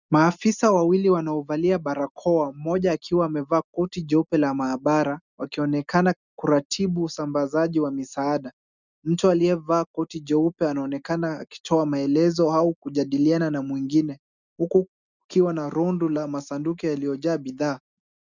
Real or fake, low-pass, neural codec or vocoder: real; 7.2 kHz; none